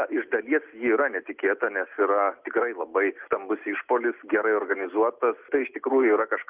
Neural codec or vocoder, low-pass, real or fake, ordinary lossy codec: none; 3.6 kHz; real; Opus, 24 kbps